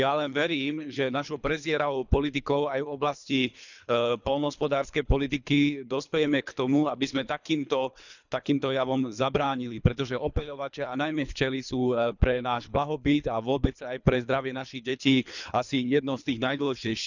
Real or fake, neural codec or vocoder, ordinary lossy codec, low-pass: fake; codec, 24 kHz, 3 kbps, HILCodec; none; 7.2 kHz